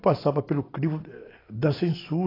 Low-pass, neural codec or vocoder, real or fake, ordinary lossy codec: 5.4 kHz; none; real; AAC, 24 kbps